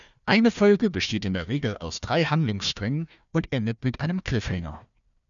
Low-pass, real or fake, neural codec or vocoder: 7.2 kHz; fake; codec, 16 kHz, 1 kbps, FunCodec, trained on Chinese and English, 50 frames a second